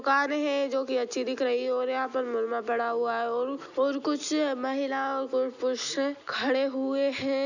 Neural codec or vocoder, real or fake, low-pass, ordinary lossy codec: none; real; 7.2 kHz; none